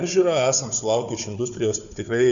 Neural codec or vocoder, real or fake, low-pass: codec, 16 kHz, 4 kbps, FunCodec, trained on Chinese and English, 50 frames a second; fake; 7.2 kHz